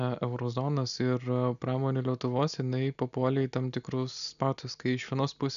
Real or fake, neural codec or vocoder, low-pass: real; none; 7.2 kHz